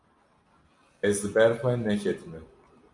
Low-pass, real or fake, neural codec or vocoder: 10.8 kHz; real; none